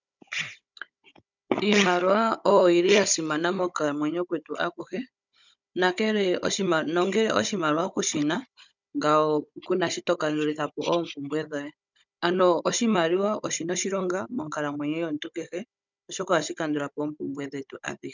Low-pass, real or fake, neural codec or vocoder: 7.2 kHz; fake; codec, 16 kHz, 16 kbps, FunCodec, trained on Chinese and English, 50 frames a second